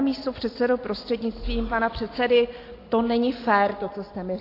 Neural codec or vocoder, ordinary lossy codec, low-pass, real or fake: none; AAC, 32 kbps; 5.4 kHz; real